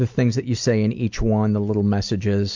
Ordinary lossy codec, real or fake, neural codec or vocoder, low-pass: MP3, 64 kbps; real; none; 7.2 kHz